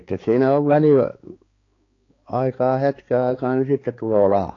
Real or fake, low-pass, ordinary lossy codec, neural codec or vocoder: fake; 7.2 kHz; AAC, 32 kbps; codec, 16 kHz, 4 kbps, X-Codec, HuBERT features, trained on balanced general audio